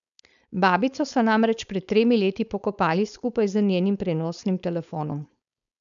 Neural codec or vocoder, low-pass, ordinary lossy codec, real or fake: codec, 16 kHz, 4.8 kbps, FACodec; 7.2 kHz; none; fake